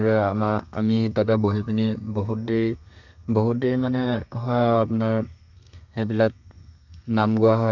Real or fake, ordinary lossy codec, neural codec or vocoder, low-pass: fake; none; codec, 32 kHz, 1.9 kbps, SNAC; 7.2 kHz